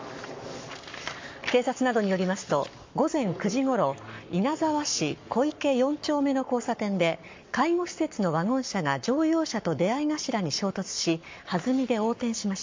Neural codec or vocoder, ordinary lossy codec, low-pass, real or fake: codec, 44.1 kHz, 7.8 kbps, Pupu-Codec; MP3, 48 kbps; 7.2 kHz; fake